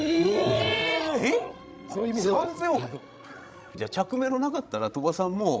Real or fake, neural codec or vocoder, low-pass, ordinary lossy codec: fake; codec, 16 kHz, 16 kbps, FreqCodec, larger model; none; none